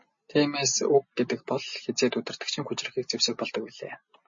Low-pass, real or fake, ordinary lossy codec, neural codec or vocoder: 7.2 kHz; real; MP3, 32 kbps; none